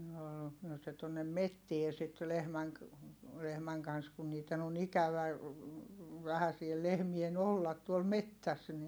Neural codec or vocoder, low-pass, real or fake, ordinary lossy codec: none; none; real; none